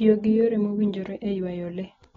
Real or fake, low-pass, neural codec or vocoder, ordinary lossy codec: real; 7.2 kHz; none; AAC, 24 kbps